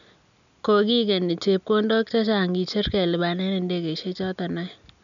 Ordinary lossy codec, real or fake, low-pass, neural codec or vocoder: none; real; 7.2 kHz; none